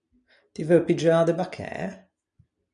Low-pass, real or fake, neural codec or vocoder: 9.9 kHz; real; none